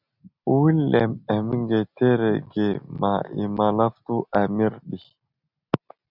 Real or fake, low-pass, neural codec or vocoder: real; 5.4 kHz; none